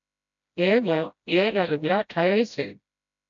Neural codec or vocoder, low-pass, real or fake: codec, 16 kHz, 0.5 kbps, FreqCodec, smaller model; 7.2 kHz; fake